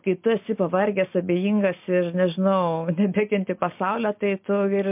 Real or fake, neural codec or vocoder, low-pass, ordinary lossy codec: real; none; 3.6 kHz; MP3, 32 kbps